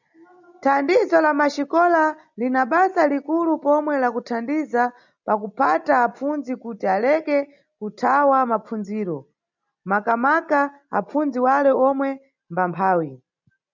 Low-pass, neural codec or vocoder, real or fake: 7.2 kHz; none; real